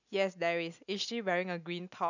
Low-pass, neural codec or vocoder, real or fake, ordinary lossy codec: 7.2 kHz; none; real; none